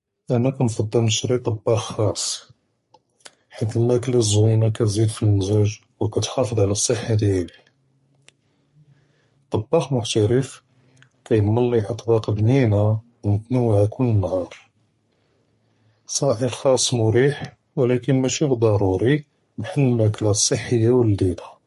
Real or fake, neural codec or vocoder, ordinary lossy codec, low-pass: fake; codec, 44.1 kHz, 3.4 kbps, Pupu-Codec; MP3, 48 kbps; 14.4 kHz